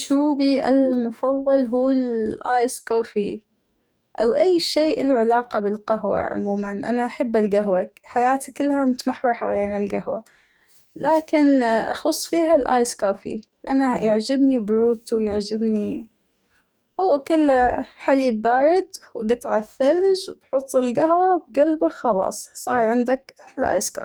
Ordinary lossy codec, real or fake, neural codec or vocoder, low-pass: none; fake; codec, 44.1 kHz, 2.6 kbps, DAC; none